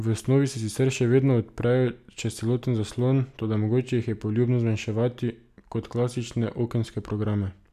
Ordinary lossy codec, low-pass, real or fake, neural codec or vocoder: none; 14.4 kHz; real; none